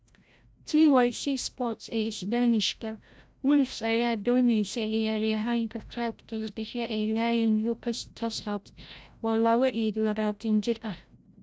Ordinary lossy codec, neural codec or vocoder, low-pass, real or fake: none; codec, 16 kHz, 0.5 kbps, FreqCodec, larger model; none; fake